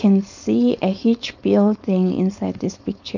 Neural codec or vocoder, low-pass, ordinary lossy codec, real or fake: codec, 16 kHz, 4.8 kbps, FACodec; 7.2 kHz; none; fake